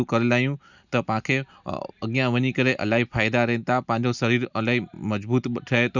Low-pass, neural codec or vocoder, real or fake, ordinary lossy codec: 7.2 kHz; none; real; none